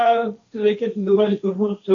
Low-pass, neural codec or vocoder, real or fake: 7.2 kHz; codec, 16 kHz, 1.1 kbps, Voila-Tokenizer; fake